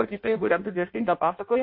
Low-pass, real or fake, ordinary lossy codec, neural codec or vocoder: 5.4 kHz; fake; MP3, 32 kbps; codec, 16 kHz in and 24 kHz out, 0.6 kbps, FireRedTTS-2 codec